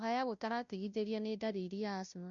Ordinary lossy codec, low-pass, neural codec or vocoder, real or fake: none; 7.2 kHz; codec, 16 kHz, 0.5 kbps, FunCodec, trained on Chinese and English, 25 frames a second; fake